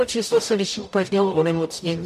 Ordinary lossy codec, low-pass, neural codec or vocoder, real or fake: MP3, 64 kbps; 14.4 kHz; codec, 44.1 kHz, 0.9 kbps, DAC; fake